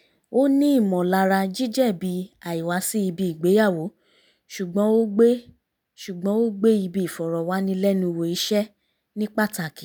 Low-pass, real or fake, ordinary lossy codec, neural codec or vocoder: none; real; none; none